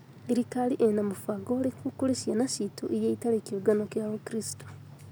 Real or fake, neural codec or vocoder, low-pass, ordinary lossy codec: real; none; none; none